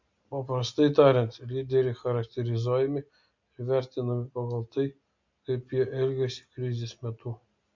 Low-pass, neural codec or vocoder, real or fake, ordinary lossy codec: 7.2 kHz; none; real; MP3, 64 kbps